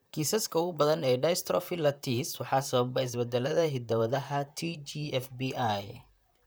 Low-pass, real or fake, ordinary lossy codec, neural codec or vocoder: none; fake; none; vocoder, 44.1 kHz, 128 mel bands, Pupu-Vocoder